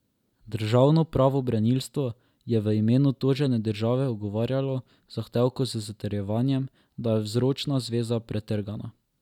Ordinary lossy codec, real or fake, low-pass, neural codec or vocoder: none; real; 19.8 kHz; none